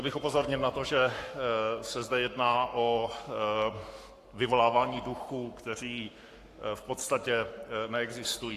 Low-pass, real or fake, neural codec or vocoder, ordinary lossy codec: 14.4 kHz; fake; codec, 44.1 kHz, 7.8 kbps, Pupu-Codec; AAC, 64 kbps